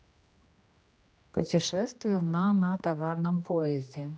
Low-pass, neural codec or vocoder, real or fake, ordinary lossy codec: none; codec, 16 kHz, 1 kbps, X-Codec, HuBERT features, trained on general audio; fake; none